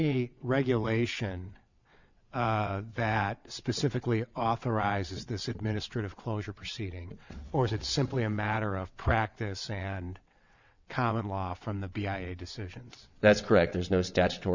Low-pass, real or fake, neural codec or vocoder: 7.2 kHz; fake; vocoder, 22.05 kHz, 80 mel bands, WaveNeXt